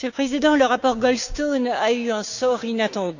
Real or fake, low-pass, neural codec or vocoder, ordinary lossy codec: fake; 7.2 kHz; codec, 16 kHz, 6 kbps, DAC; none